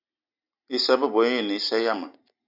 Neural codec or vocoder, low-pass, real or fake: none; 5.4 kHz; real